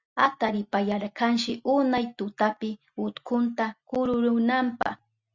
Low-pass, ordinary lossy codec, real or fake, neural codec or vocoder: 7.2 kHz; Opus, 64 kbps; real; none